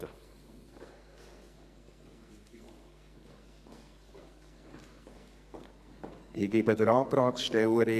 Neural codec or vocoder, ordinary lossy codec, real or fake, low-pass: codec, 44.1 kHz, 2.6 kbps, SNAC; none; fake; 14.4 kHz